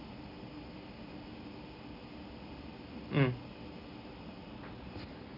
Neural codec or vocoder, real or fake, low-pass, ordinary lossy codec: none; real; 5.4 kHz; none